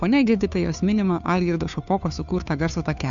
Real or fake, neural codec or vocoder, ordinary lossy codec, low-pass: fake; codec, 16 kHz, 2 kbps, FunCodec, trained on Chinese and English, 25 frames a second; MP3, 96 kbps; 7.2 kHz